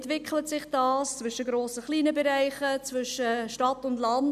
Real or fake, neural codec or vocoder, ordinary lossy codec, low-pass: real; none; none; 14.4 kHz